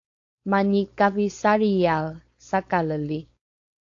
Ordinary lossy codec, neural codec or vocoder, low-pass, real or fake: AAC, 48 kbps; codec, 16 kHz, 4.8 kbps, FACodec; 7.2 kHz; fake